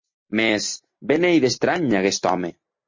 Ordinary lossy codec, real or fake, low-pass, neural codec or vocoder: MP3, 32 kbps; real; 7.2 kHz; none